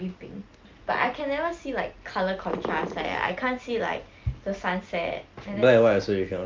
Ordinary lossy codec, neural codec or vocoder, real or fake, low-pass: Opus, 24 kbps; none; real; 7.2 kHz